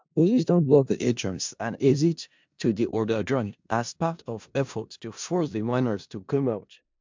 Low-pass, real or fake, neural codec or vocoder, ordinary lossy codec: 7.2 kHz; fake; codec, 16 kHz in and 24 kHz out, 0.4 kbps, LongCat-Audio-Codec, four codebook decoder; MP3, 64 kbps